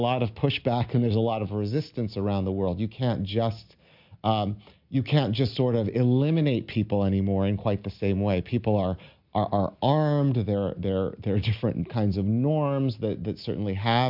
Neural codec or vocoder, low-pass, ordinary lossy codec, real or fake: none; 5.4 kHz; MP3, 48 kbps; real